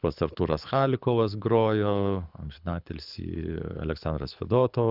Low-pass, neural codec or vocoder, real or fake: 5.4 kHz; codec, 24 kHz, 6 kbps, HILCodec; fake